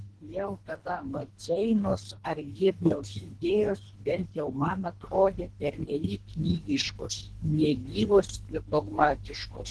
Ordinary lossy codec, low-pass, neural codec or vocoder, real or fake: Opus, 16 kbps; 10.8 kHz; codec, 24 kHz, 1.5 kbps, HILCodec; fake